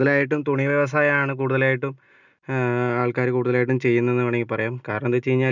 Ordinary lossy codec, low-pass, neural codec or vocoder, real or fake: none; 7.2 kHz; none; real